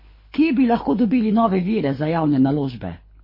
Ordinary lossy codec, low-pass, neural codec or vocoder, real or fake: MP3, 24 kbps; 5.4 kHz; codec, 24 kHz, 6 kbps, HILCodec; fake